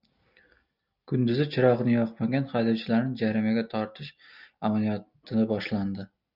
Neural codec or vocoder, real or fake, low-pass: none; real; 5.4 kHz